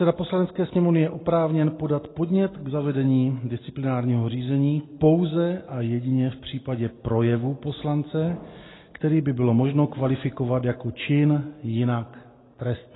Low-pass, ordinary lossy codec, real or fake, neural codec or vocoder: 7.2 kHz; AAC, 16 kbps; real; none